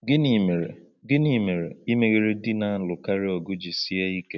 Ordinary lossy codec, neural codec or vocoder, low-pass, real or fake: none; none; 7.2 kHz; real